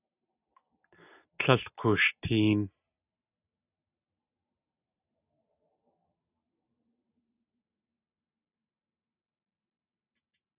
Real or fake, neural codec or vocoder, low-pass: real; none; 3.6 kHz